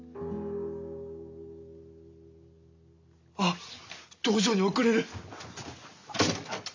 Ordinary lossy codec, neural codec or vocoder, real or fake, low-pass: AAC, 48 kbps; none; real; 7.2 kHz